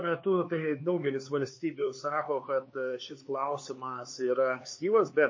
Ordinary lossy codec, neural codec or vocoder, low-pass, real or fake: MP3, 32 kbps; codec, 16 kHz, 4 kbps, X-Codec, HuBERT features, trained on LibriSpeech; 7.2 kHz; fake